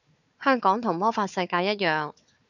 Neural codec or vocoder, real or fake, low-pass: codec, 16 kHz, 4 kbps, FunCodec, trained on Chinese and English, 50 frames a second; fake; 7.2 kHz